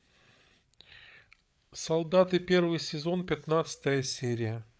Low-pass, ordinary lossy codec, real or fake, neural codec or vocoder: none; none; fake; codec, 16 kHz, 8 kbps, FreqCodec, larger model